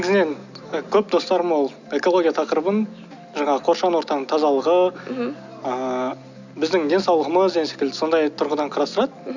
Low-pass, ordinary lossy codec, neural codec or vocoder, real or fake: 7.2 kHz; none; none; real